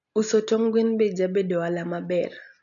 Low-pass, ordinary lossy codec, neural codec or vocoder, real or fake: 7.2 kHz; none; none; real